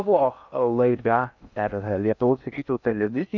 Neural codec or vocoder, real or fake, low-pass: codec, 16 kHz in and 24 kHz out, 0.6 kbps, FocalCodec, streaming, 2048 codes; fake; 7.2 kHz